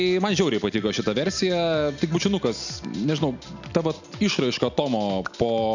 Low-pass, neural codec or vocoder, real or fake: 7.2 kHz; none; real